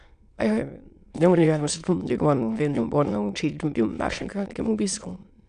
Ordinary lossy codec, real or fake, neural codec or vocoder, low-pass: none; fake; autoencoder, 22.05 kHz, a latent of 192 numbers a frame, VITS, trained on many speakers; 9.9 kHz